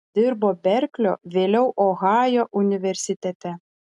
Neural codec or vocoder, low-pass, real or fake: none; 10.8 kHz; real